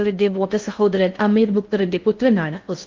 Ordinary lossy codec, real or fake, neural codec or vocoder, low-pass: Opus, 24 kbps; fake; codec, 16 kHz in and 24 kHz out, 0.6 kbps, FocalCodec, streaming, 4096 codes; 7.2 kHz